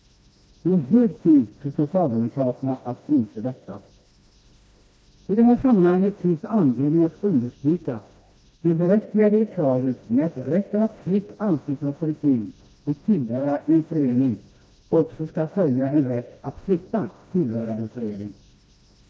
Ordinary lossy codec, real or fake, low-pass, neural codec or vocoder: none; fake; none; codec, 16 kHz, 1 kbps, FreqCodec, smaller model